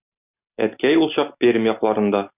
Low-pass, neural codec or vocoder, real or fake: 3.6 kHz; none; real